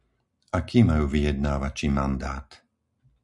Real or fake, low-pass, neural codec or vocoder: real; 10.8 kHz; none